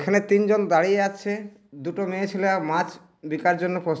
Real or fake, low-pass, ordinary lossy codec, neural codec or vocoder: real; none; none; none